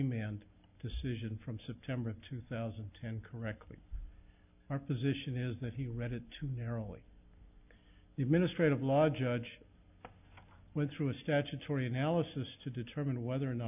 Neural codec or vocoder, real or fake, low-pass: none; real; 3.6 kHz